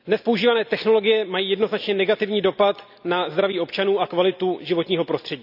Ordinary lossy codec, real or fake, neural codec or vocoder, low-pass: none; real; none; 5.4 kHz